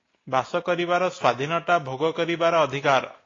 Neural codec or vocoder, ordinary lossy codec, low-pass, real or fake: none; AAC, 32 kbps; 7.2 kHz; real